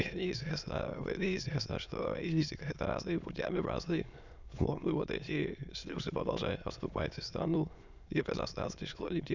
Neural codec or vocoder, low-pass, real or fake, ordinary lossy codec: autoencoder, 22.05 kHz, a latent of 192 numbers a frame, VITS, trained on many speakers; 7.2 kHz; fake; none